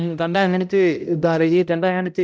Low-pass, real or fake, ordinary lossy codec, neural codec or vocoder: none; fake; none; codec, 16 kHz, 0.5 kbps, X-Codec, HuBERT features, trained on balanced general audio